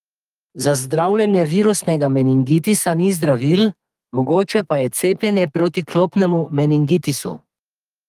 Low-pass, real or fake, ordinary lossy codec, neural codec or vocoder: 14.4 kHz; fake; Opus, 32 kbps; codec, 32 kHz, 1.9 kbps, SNAC